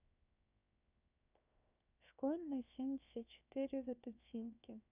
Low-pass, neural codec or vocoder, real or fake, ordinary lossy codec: 3.6 kHz; codec, 16 kHz, 0.7 kbps, FocalCodec; fake; none